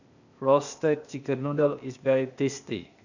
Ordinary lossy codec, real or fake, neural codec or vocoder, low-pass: none; fake; codec, 16 kHz, 0.8 kbps, ZipCodec; 7.2 kHz